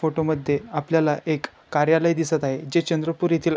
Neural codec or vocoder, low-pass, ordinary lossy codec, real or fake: none; none; none; real